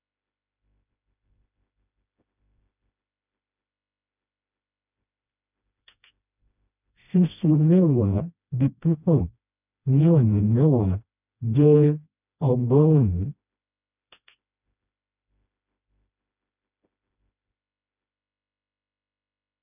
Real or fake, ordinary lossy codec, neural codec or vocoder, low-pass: fake; none; codec, 16 kHz, 1 kbps, FreqCodec, smaller model; 3.6 kHz